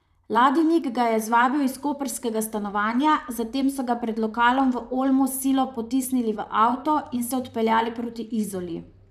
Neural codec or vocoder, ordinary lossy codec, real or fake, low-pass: vocoder, 44.1 kHz, 128 mel bands, Pupu-Vocoder; none; fake; 14.4 kHz